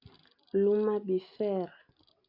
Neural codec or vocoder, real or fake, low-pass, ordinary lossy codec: none; real; 5.4 kHz; AAC, 48 kbps